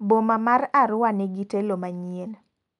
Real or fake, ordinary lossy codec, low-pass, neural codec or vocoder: real; MP3, 96 kbps; 10.8 kHz; none